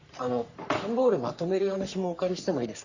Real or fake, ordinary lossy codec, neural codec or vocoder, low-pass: fake; Opus, 64 kbps; codec, 44.1 kHz, 3.4 kbps, Pupu-Codec; 7.2 kHz